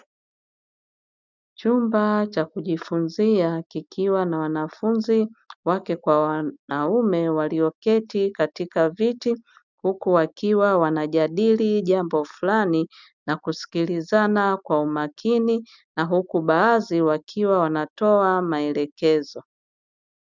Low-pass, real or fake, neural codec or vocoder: 7.2 kHz; real; none